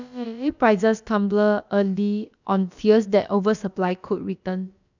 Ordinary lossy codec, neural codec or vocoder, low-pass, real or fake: none; codec, 16 kHz, about 1 kbps, DyCAST, with the encoder's durations; 7.2 kHz; fake